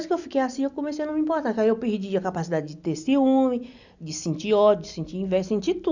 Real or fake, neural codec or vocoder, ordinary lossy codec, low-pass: real; none; none; 7.2 kHz